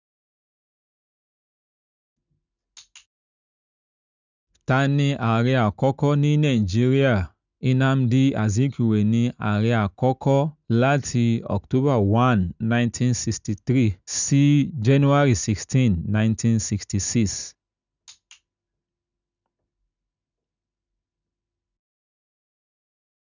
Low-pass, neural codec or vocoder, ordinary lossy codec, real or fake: 7.2 kHz; none; none; real